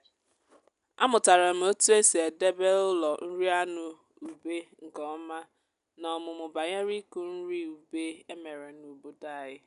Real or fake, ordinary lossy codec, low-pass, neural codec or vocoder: real; none; 10.8 kHz; none